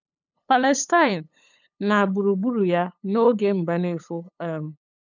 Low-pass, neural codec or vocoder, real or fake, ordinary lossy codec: 7.2 kHz; codec, 16 kHz, 8 kbps, FunCodec, trained on LibriTTS, 25 frames a second; fake; none